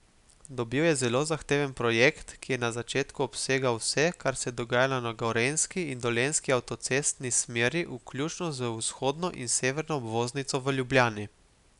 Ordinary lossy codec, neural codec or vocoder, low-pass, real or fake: none; none; 10.8 kHz; real